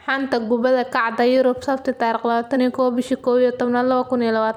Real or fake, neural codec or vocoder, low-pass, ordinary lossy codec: fake; vocoder, 44.1 kHz, 128 mel bands every 256 samples, BigVGAN v2; 19.8 kHz; none